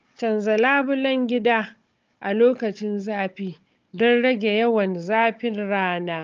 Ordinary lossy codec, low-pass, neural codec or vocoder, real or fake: Opus, 24 kbps; 7.2 kHz; codec, 16 kHz, 16 kbps, FunCodec, trained on Chinese and English, 50 frames a second; fake